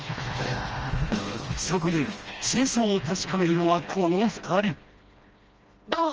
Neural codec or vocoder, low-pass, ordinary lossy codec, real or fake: codec, 16 kHz, 1 kbps, FreqCodec, smaller model; 7.2 kHz; Opus, 24 kbps; fake